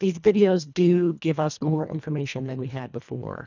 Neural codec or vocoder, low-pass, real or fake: codec, 24 kHz, 1.5 kbps, HILCodec; 7.2 kHz; fake